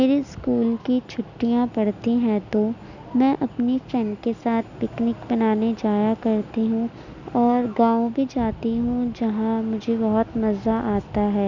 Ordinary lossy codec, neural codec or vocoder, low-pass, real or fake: none; none; 7.2 kHz; real